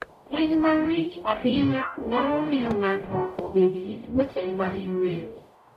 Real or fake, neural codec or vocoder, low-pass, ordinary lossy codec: fake; codec, 44.1 kHz, 0.9 kbps, DAC; 14.4 kHz; none